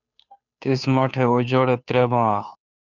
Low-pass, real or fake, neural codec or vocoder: 7.2 kHz; fake; codec, 16 kHz, 2 kbps, FunCodec, trained on Chinese and English, 25 frames a second